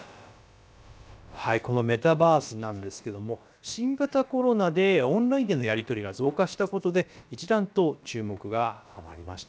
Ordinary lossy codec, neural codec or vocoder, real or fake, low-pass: none; codec, 16 kHz, about 1 kbps, DyCAST, with the encoder's durations; fake; none